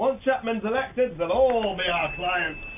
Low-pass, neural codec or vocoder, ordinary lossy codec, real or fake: 3.6 kHz; none; AAC, 32 kbps; real